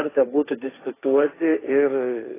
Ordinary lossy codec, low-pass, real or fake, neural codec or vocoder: AAC, 16 kbps; 3.6 kHz; fake; codec, 16 kHz, 1.1 kbps, Voila-Tokenizer